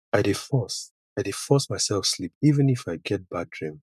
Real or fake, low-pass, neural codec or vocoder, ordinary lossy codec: fake; 14.4 kHz; vocoder, 44.1 kHz, 128 mel bands every 512 samples, BigVGAN v2; none